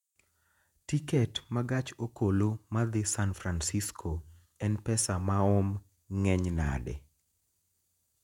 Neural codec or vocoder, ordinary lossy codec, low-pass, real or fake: vocoder, 48 kHz, 128 mel bands, Vocos; none; 19.8 kHz; fake